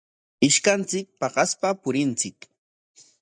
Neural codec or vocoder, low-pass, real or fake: none; 9.9 kHz; real